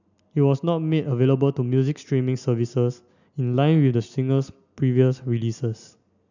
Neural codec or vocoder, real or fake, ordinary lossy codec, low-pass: none; real; none; 7.2 kHz